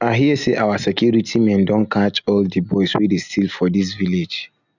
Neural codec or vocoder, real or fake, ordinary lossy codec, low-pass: none; real; none; 7.2 kHz